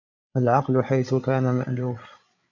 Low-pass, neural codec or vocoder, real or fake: 7.2 kHz; codec, 16 kHz, 8 kbps, FreqCodec, larger model; fake